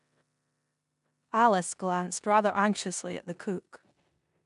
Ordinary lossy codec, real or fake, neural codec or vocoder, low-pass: none; fake; codec, 16 kHz in and 24 kHz out, 0.9 kbps, LongCat-Audio-Codec, four codebook decoder; 10.8 kHz